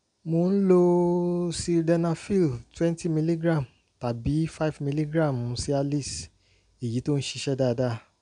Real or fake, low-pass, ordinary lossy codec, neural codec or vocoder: real; 9.9 kHz; none; none